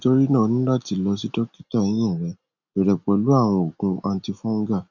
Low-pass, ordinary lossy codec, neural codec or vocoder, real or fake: 7.2 kHz; none; none; real